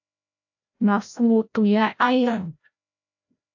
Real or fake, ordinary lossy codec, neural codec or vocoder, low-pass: fake; AAC, 48 kbps; codec, 16 kHz, 0.5 kbps, FreqCodec, larger model; 7.2 kHz